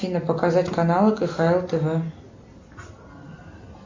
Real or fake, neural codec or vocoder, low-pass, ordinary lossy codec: real; none; 7.2 kHz; MP3, 64 kbps